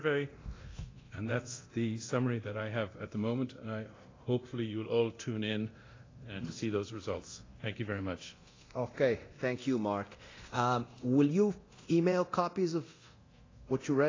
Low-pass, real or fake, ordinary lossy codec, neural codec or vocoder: 7.2 kHz; fake; AAC, 32 kbps; codec, 24 kHz, 0.9 kbps, DualCodec